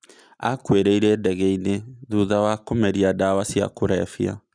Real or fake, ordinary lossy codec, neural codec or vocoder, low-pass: real; none; none; 9.9 kHz